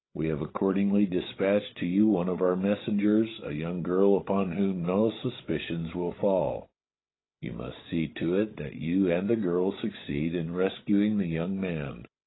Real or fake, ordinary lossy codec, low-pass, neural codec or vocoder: fake; AAC, 16 kbps; 7.2 kHz; codec, 16 kHz, 16 kbps, FreqCodec, larger model